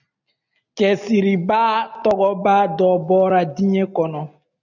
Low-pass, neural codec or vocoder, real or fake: 7.2 kHz; none; real